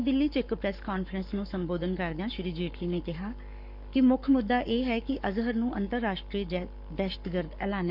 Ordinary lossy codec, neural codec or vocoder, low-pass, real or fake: none; codec, 44.1 kHz, 7.8 kbps, Pupu-Codec; 5.4 kHz; fake